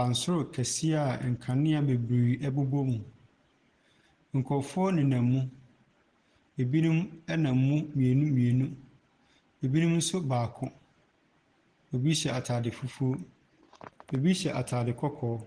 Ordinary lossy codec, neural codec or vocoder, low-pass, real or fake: Opus, 16 kbps; none; 9.9 kHz; real